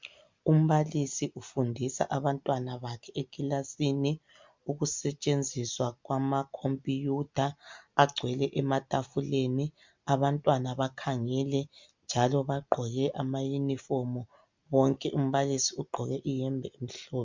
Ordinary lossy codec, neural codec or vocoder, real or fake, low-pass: MP3, 64 kbps; none; real; 7.2 kHz